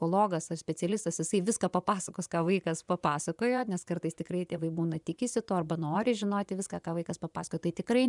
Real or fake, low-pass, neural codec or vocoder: fake; 10.8 kHz; vocoder, 24 kHz, 100 mel bands, Vocos